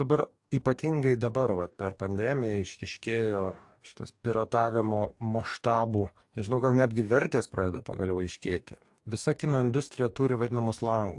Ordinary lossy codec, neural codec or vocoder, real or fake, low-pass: AAC, 64 kbps; codec, 44.1 kHz, 2.6 kbps, DAC; fake; 10.8 kHz